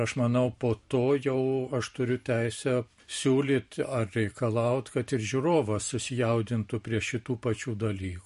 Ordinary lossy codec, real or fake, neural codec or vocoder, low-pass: MP3, 48 kbps; fake; vocoder, 44.1 kHz, 128 mel bands every 512 samples, BigVGAN v2; 14.4 kHz